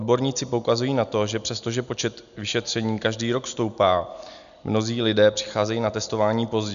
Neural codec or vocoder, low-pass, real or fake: none; 7.2 kHz; real